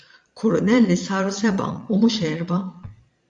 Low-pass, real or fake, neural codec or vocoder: 9.9 kHz; fake; vocoder, 22.05 kHz, 80 mel bands, Vocos